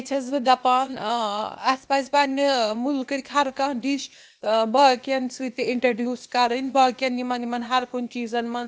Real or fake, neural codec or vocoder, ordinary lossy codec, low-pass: fake; codec, 16 kHz, 0.8 kbps, ZipCodec; none; none